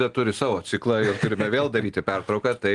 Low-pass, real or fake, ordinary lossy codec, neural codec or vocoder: 10.8 kHz; real; Opus, 24 kbps; none